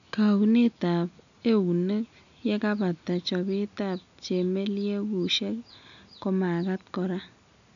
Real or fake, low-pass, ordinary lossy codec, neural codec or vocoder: real; 7.2 kHz; none; none